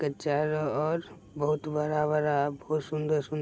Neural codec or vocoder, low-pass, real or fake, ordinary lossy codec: none; none; real; none